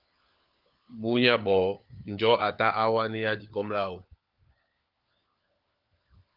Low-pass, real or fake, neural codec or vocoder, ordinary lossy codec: 5.4 kHz; fake; codec, 16 kHz, 4 kbps, FunCodec, trained on LibriTTS, 50 frames a second; Opus, 24 kbps